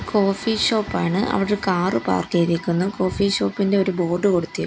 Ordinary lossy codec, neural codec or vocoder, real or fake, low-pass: none; none; real; none